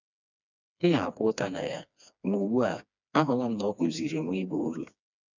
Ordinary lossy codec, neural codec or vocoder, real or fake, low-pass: none; codec, 16 kHz, 2 kbps, FreqCodec, smaller model; fake; 7.2 kHz